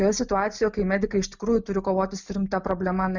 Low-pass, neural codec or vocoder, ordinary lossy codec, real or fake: 7.2 kHz; none; Opus, 64 kbps; real